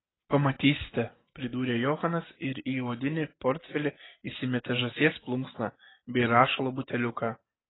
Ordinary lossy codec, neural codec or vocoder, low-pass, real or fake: AAC, 16 kbps; none; 7.2 kHz; real